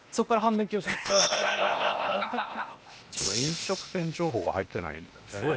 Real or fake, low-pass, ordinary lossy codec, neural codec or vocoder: fake; none; none; codec, 16 kHz, 0.8 kbps, ZipCodec